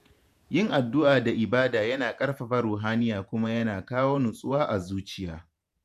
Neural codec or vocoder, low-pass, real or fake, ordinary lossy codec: vocoder, 48 kHz, 128 mel bands, Vocos; 14.4 kHz; fake; none